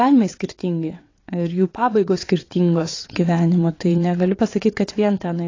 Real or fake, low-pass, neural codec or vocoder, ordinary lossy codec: fake; 7.2 kHz; vocoder, 22.05 kHz, 80 mel bands, WaveNeXt; AAC, 32 kbps